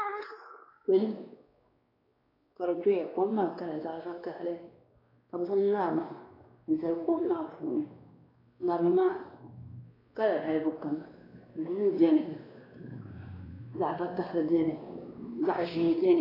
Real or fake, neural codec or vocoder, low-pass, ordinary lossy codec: fake; codec, 16 kHz, 2 kbps, X-Codec, WavLM features, trained on Multilingual LibriSpeech; 5.4 kHz; AAC, 24 kbps